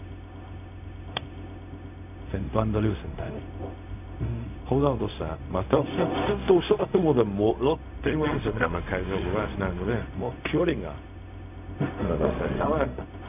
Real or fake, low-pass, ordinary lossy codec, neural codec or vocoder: fake; 3.6 kHz; none; codec, 16 kHz, 0.4 kbps, LongCat-Audio-Codec